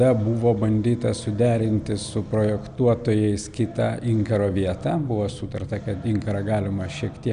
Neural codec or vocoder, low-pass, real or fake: none; 9.9 kHz; real